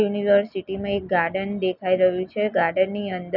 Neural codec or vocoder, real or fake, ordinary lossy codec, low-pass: none; real; none; 5.4 kHz